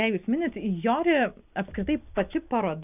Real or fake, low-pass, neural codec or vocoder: real; 3.6 kHz; none